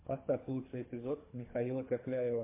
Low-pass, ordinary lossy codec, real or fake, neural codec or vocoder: 3.6 kHz; MP3, 24 kbps; fake; codec, 24 kHz, 3 kbps, HILCodec